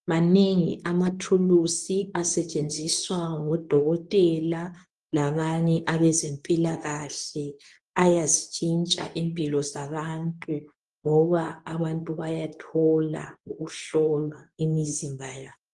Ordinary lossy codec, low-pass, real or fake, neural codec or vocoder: Opus, 24 kbps; 10.8 kHz; fake; codec, 24 kHz, 0.9 kbps, WavTokenizer, medium speech release version 2